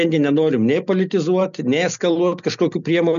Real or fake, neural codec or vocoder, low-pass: real; none; 7.2 kHz